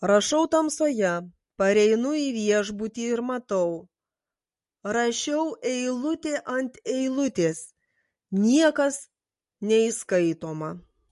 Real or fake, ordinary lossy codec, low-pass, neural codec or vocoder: real; MP3, 48 kbps; 14.4 kHz; none